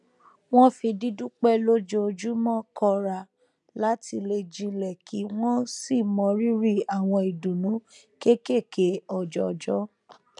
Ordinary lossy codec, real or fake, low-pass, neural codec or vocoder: none; real; 10.8 kHz; none